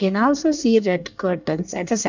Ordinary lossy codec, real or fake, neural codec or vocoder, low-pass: none; fake; codec, 24 kHz, 1 kbps, SNAC; 7.2 kHz